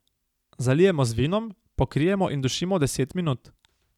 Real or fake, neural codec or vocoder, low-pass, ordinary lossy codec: real; none; 19.8 kHz; none